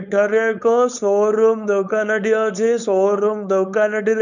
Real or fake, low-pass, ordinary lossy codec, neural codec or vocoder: fake; 7.2 kHz; none; codec, 16 kHz, 4.8 kbps, FACodec